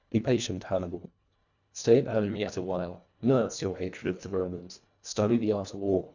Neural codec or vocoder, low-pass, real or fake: codec, 24 kHz, 1.5 kbps, HILCodec; 7.2 kHz; fake